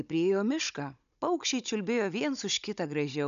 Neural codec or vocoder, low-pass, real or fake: codec, 16 kHz, 16 kbps, FunCodec, trained on Chinese and English, 50 frames a second; 7.2 kHz; fake